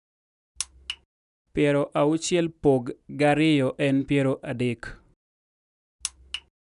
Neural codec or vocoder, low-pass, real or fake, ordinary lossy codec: none; 10.8 kHz; real; none